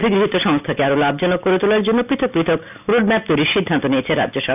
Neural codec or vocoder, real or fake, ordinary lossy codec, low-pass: none; real; none; 3.6 kHz